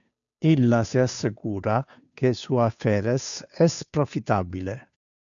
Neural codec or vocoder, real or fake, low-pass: codec, 16 kHz, 2 kbps, FunCodec, trained on Chinese and English, 25 frames a second; fake; 7.2 kHz